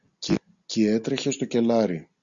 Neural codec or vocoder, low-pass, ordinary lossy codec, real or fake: none; 7.2 kHz; Opus, 64 kbps; real